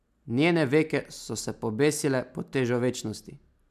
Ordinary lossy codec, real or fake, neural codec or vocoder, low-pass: MP3, 96 kbps; real; none; 14.4 kHz